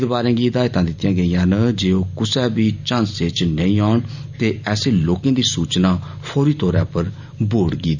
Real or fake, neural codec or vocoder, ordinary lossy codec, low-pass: real; none; none; 7.2 kHz